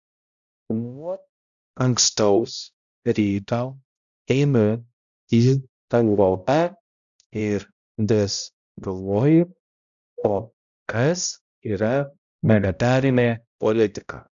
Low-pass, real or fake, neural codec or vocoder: 7.2 kHz; fake; codec, 16 kHz, 0.5 kbps, X-Codec, HuBERT features, trained on balanced general audio